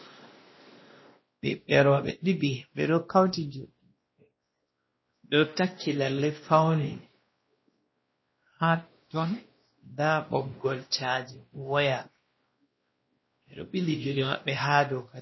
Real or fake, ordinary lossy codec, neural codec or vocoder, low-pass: fake; MP3, 24 kbps; codec, 16 kHz, 1 kbps, X-Codec, WavLM features, trained on Multilingual LibriSpeech; 7.2 kHz